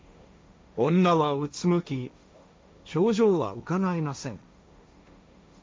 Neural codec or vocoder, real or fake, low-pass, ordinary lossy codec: codec, 16 kHz, 1.1 kbps, Voila-Tokenizer; fake; none; none